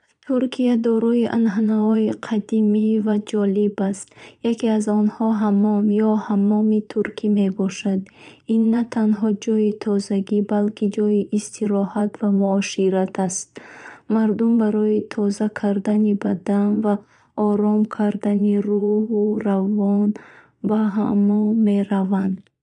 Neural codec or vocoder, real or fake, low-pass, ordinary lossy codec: vocoder, 22.05 kHz, 80 mel bands, Vocos; fake; 9.9 kHz; none